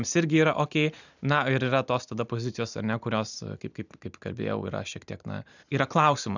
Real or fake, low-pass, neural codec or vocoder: real; 7.2 kHz; none